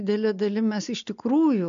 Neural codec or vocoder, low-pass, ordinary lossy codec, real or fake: none; 7.2 kHz; AAC, 96 kbps; real